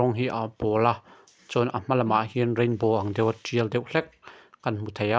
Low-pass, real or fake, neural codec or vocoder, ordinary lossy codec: none; real; none; none